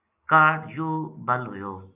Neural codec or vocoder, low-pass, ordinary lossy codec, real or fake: none; 3.6 kHz; AAC, 24 kbps; real